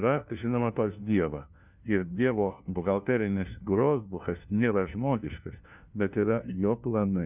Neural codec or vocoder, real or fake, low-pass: codec, 16 kHz, 1 kbps, FunCodec, trained on LibriTTS, 50 frames a second; fake; 3.6 kHz